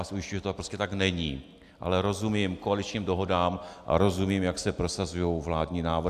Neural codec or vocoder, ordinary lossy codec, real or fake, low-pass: none; AAC, 96 kbps; real; 14.4 kHz